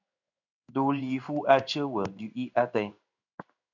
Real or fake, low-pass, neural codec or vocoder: fake; 7.2 kHz; codec, 16 kHz in and 24 kHz out, 1 kbps, XY-Tokenizer